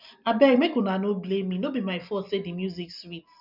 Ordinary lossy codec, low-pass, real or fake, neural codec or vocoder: none; 5.4 kHz; real; none